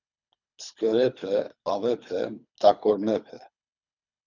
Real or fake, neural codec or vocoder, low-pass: fake; codec, 24 kHz, 3 kbps, HILCodec; 7.2 kHz